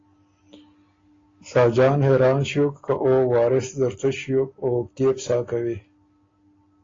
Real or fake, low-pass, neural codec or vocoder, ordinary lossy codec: real; 7.2 kHz; none; AAC, 32 kbps